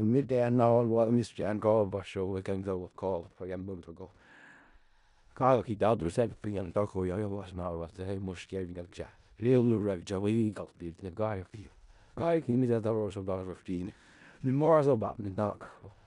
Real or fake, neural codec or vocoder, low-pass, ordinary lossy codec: fake; codec, 16 kHz in and 24 kHz out, 0.4 kbps, LongCat-Audio-Codec, four codebook decoder; 10.8 kHz; none